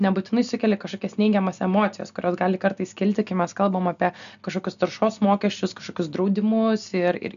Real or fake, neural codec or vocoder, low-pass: real; none; 7.2 kHz